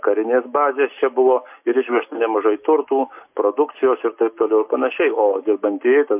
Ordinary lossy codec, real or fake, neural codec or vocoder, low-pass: MP3, 32 kbps; real; none; 3.6 kHz